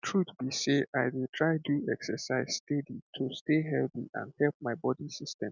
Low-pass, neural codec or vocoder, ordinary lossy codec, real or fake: 7.2 kHz; none; none; real